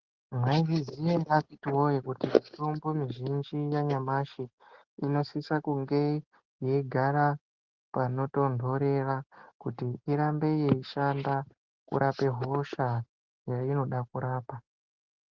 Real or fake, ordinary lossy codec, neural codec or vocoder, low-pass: real; Opus, 16 kbps; none; 7.2 kHz